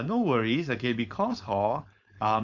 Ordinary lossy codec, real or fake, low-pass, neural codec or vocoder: none; fake; 7.2 kHz; codec, 16 kHz, 4.8 kbps, FACodec